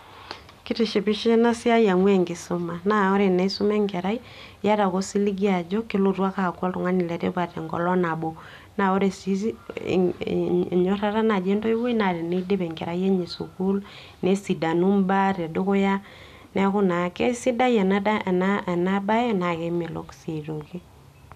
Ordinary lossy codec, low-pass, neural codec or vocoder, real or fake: none; 14.4 kHz; none; real